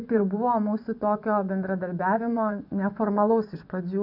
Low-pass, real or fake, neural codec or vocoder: 5.4 kHz; fake; vocoder, 24 kHz, 100 mel bands, Vocos